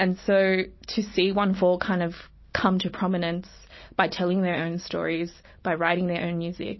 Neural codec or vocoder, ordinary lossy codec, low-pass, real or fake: none; MP3, 24 kbps; 7.2 kHz; real